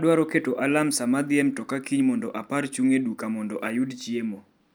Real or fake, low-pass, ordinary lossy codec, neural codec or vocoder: real; none; none; none